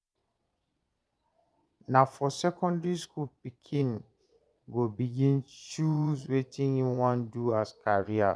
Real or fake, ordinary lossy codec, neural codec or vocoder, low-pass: fake; none; vocoder, 22.05 kHz, 80 mel bands, Vocos; none